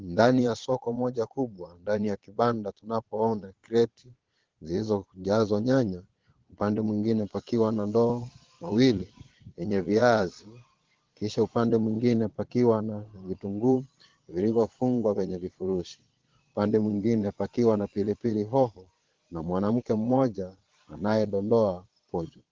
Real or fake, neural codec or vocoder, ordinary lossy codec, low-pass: fake; vocoder, 22.05 kHz, 80 mel bands, Vocos; Opus, 16 kbps; 7.2 kHz